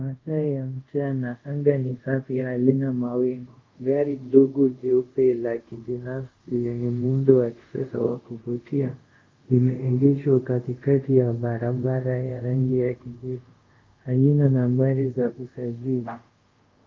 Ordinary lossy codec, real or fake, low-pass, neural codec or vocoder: Opus, 24 kbps; fake; 7.2 kHz; codec, 24 kHz, 0.5 kbps, DualCodec